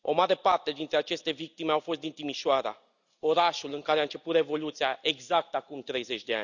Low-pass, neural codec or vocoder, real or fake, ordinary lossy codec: 7.2 kHz; none; real; none